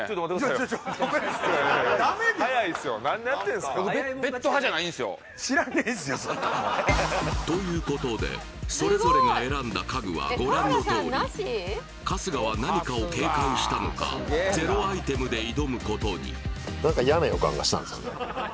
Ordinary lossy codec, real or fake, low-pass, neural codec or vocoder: none; real; none; none